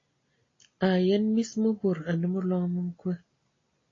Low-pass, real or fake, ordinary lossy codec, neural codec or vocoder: 7.2 kHz; real; AAC, 32 kbps; none